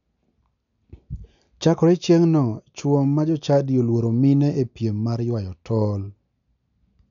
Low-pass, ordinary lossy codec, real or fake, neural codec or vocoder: 7.2 kHz; none; real; none